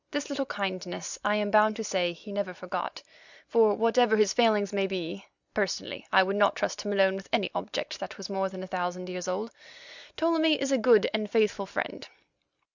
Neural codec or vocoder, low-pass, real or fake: none; 7.2 kHz; real